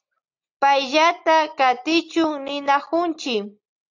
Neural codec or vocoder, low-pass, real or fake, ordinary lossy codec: none; 7.2 kHz; real; AAC, 48 kbps